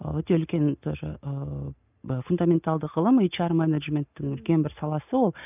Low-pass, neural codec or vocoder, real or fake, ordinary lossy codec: 3.6 kHz; none; real; none